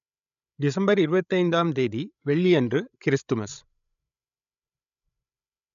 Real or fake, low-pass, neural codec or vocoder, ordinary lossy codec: fake; 7.2 kHz; codec, 16 kHz, 16 kbps, FreqCodec, larger model; none